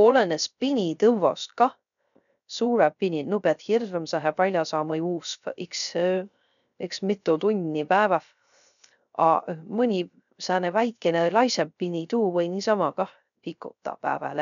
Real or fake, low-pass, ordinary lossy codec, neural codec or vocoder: fake; 7.2 kHz; none; codec, 16 kHz, 0.3 kbps, FocalCodec